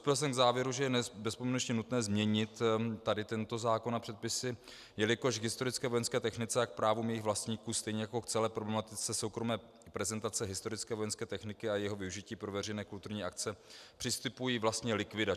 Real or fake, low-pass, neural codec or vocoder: real; 14.4 kHz; none